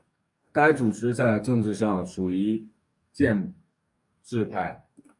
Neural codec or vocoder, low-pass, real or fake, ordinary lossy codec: codec, 44.1 kHz, 2.6 kbps, DAC; 10.8 kHz; fake; MP3, 64 kbps